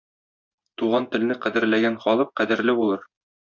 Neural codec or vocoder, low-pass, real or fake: vocoder, 24 kHz, 100 mel bands, Vocos; 7.2 kHz; fake